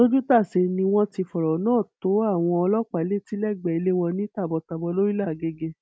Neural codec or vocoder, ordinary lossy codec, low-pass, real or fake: none; none; none; real